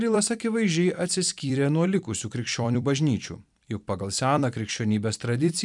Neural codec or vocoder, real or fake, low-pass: vocoder, 44.1 kHz, 128 mel bands every 256 samples, BigVGAN v2; fake; 10.8 kHz